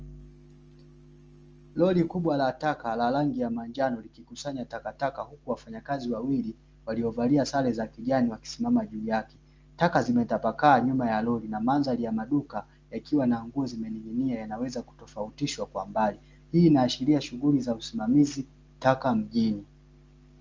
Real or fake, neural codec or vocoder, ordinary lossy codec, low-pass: real; none; Opus, 24 kbps; 7.2 kHz